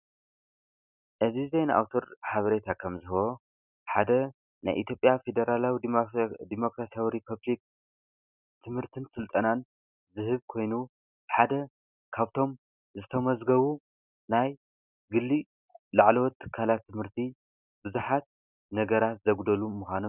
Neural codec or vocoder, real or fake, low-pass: none; real; 3.6 kHz